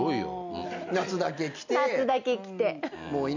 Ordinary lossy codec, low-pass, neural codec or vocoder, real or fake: none; 7.2 kHz; none; real